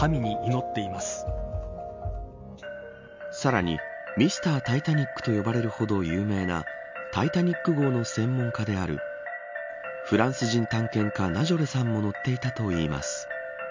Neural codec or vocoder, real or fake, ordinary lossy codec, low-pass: none; real; MP3, 64 kbps; 7.2 kHz